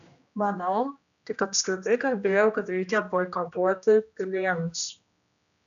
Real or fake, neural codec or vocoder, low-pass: fake; codec, 16 kHz, 1 kbps, X-Codec, HuBERT features, trained on general audio; 7.2 kHz